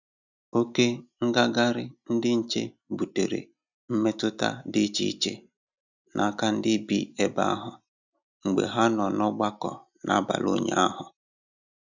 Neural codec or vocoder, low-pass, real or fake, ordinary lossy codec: none; 7.2 kHz; real; none